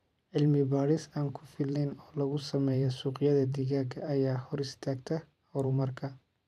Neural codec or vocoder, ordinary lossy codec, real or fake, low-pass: vocoder, 44.1 kHz, 128 mel bands every 512 samples, BigVGAN v2; none; fake; 14.4 kHz